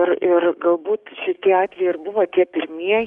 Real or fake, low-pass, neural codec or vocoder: fake; 10.8 kHz; codec, 44.1 kHz, 7.8 kbps, DAC